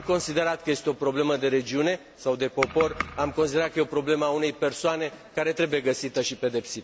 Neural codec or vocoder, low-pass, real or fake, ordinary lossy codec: none; none; real; none